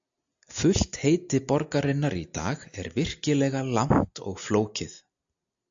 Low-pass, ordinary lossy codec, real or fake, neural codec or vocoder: 7.2 kHz; AAC, 64 kbps; real; none